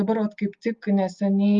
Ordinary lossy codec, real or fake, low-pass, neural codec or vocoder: Opus, 32 kbps; real; 7.2 kHz; none